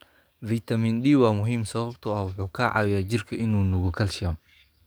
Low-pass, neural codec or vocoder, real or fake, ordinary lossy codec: none; codec, 44.1 kHz, 7.8 kbps, DAC; fake; none